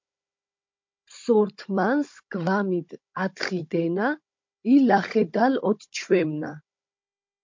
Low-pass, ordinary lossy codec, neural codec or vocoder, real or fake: 7.2 kHz; MP3, 48 kbps; codec, 16 kHz, 16 kbps, FunCodec, trained on Chinese and English, 50 frames a second; fake